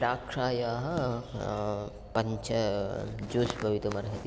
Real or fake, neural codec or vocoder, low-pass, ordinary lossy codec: real; none; none; none